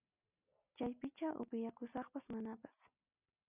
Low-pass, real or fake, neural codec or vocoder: 3.6 kHz; real; none